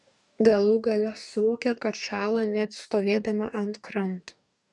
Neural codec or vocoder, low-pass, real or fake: codec, 44.1 kHz, 2.6 kbps, DAC; 10.8 kHz; fake